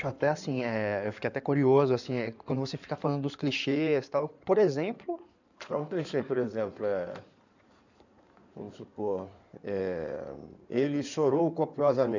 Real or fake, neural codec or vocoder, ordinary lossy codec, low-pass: fake; codec, 16 kHz in and 24 kHz out, 2.2 kbps, FireRedTTS-2 codec; none; 7.2 kHz